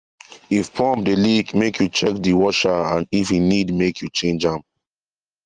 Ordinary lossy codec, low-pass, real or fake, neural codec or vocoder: Opus, 24 kbps; 9.9 kHz; real; none